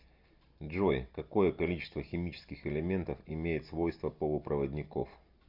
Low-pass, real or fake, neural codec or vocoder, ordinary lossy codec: 5.4 kHz; real; none; Opus, 64 kbps